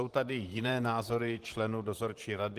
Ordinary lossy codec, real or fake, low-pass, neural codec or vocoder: Opus, 24 kbps; fake; 14.4 kHz; vocoder, 44.1 kHz, 128 mel bands, Pupu-Vocoder